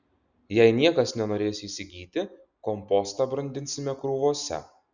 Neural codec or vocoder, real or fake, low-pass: none; real; 7.2 kHz